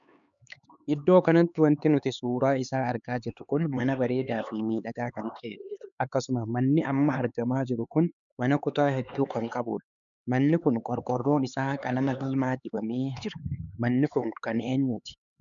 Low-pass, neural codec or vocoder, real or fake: 7.2 kHz; codec, 16 kHz, 4 kbps, X-Codec, HuBERT features, trained on LibriSpeech; fake